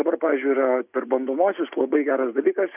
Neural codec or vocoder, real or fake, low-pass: none; real; 3.6 kHz